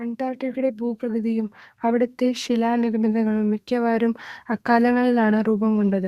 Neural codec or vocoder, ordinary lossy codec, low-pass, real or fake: codec, 32 kHz, 1.9 kbps, SNAC; Opus, 64 kbps; 14.4 kHz; fake